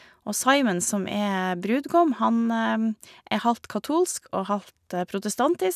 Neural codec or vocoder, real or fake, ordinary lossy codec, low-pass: none; real; none; 14.4 kHz